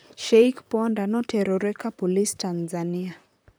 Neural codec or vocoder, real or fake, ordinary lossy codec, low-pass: vocoder, 44.1 kHz, 128 mel bands, Pupu-Vocoder; fake; none; none